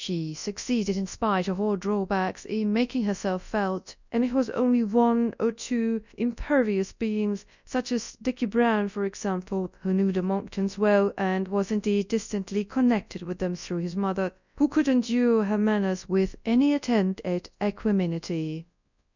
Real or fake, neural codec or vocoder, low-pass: fake; codec, 24 kHz, 0.9 kbps, WavTokenizer, large speech release; 7.2 kHz